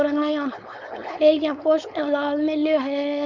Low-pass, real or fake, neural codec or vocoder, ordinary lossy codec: 7.2 kHz; fake; codec, 16 kHz, 4.8 kbps, FACodec; none